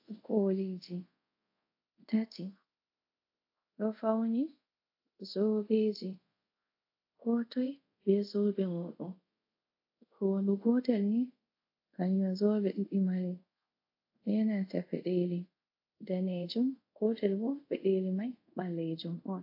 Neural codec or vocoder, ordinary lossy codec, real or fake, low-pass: codec, 24 kHz, 0.5 kbps, DualCodec; AAC, 32 kbps; fake; 5.4 kHz